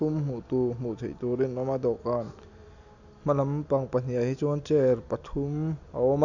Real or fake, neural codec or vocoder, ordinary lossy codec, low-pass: real; none; none; 7.2 kHz